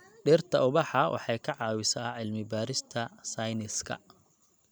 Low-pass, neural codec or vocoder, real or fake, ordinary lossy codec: none; none; real; none